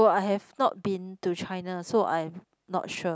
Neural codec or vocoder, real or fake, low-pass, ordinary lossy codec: none; real; none; none